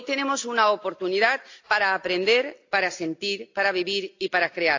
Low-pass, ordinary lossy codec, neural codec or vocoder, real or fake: 7.2 kHz; AAC, 48 kbps; none; real